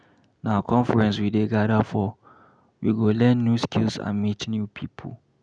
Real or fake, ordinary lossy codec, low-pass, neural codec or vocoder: real; none; 9.9 kHz; none